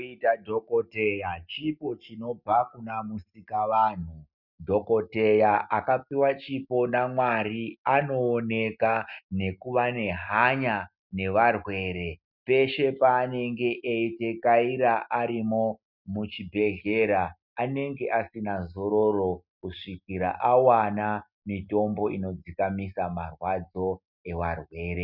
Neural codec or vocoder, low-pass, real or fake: none; 5.4 kHz; real